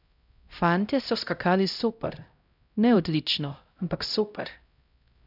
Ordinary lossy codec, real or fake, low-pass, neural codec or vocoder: none; fake; 5.4 kHz; codec, 16 kHz, 0.5 kbps, X-Codec, HuBERT features, trained on LibriSpeech